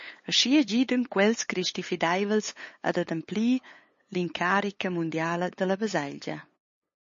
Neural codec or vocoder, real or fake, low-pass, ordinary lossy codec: codec, 16 kHz, 8 kbps, FunCodec, trained on LibriTTS, 25 frames a second; fake; 7.2 kHz; MP3, 32 kbps